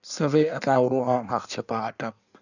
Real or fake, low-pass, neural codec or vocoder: fake; 7.2 kHz; codec, 16 kHz in and 24 kHz out, 1.1 kbps, FireRedTTS-2 codec